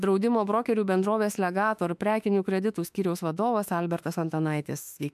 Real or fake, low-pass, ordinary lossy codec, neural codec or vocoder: fake; 14.4 kHz; MP3, 96 kbps; autoencoder, 48 kHz, 32 numbers a frame, DAC-VAE, trained on Japanese speech